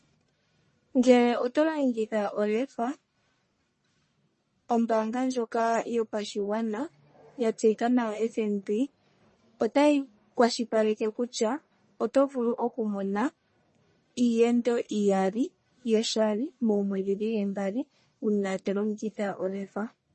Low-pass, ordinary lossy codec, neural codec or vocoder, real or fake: 10.8 kHz; MP3, 32 kbps; codec, 44.1 kHz, 1.7 kbps, Pupu-Codec; fake